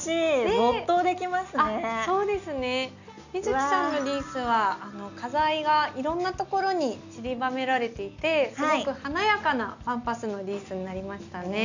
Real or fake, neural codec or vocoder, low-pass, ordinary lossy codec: real; none; 7.2 kHz; none